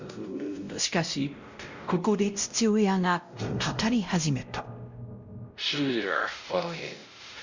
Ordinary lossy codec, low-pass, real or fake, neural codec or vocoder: Opus, 64 kbps; 7.2 kHz; fake; codec, 16 kHz, 0.5 kbps, X-Codec, WavLM features, trained on Multilingual LibriSpeech